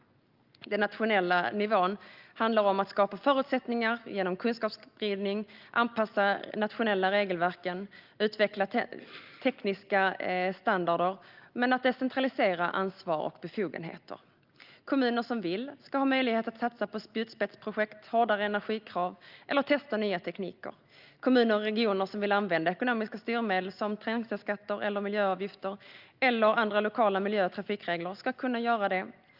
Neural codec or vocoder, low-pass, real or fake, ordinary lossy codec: none; 5.4 kHz; real; Opus, 24 kbps